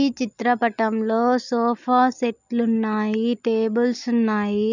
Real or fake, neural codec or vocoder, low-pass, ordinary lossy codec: real; none; 7.2 kHz; none